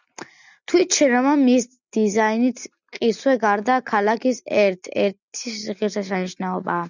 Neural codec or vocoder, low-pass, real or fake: none; 7.2 kHz; real